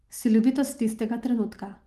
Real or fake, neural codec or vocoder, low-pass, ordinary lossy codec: real; none; 14.4 kHz; Opus, 32 kbps